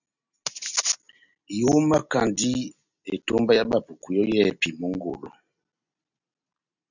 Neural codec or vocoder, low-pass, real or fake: none; 7.2 kHz; real